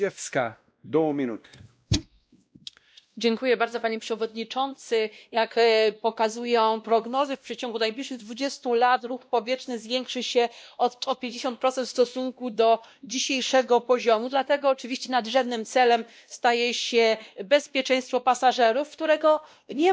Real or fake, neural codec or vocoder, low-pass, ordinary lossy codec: fake; codec, 16 kHz, 1 kbps, X-Codec, WavLM features, trained on Multilingual LibriSpeech; none; none